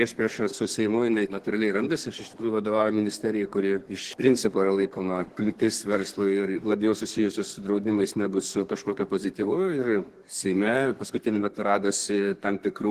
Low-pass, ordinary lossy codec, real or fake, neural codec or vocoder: 14.4 kHz; Opus, 16 kbps; fake; codec, 32 kHz, 1.9 kbps, SNAC